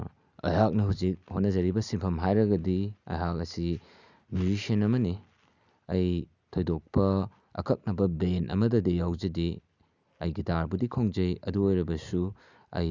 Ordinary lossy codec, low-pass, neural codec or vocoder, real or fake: none; 7.2 kHz; none; real